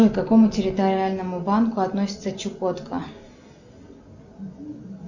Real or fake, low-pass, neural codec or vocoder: real; 7.2 kHz; none